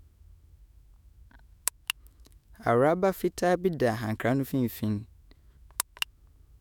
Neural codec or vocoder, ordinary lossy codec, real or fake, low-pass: autoencoder, 48 kHz, 128 numbers a frame, DAC-VAE, trained on Japanese speech; none; fake; none